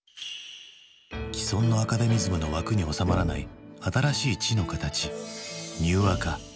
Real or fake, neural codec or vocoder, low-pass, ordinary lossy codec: real; none; none; none